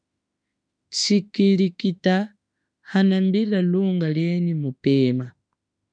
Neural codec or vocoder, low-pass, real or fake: autoencoder, 48 kHz, 32 numbers a frame, DAC-VAE, trained on Japanese speech; 9.9 kHz; fake